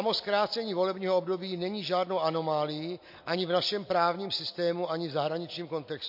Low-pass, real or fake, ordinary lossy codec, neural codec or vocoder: 5.4 kHz; real; MP3, 32 kbps; none